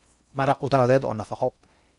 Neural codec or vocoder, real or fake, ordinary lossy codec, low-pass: codec, 16 kHz in and 24 kHz out, 0.6 kbps, FocalCodec, streaming, 4096 codes; fake; none; 10.8 kHz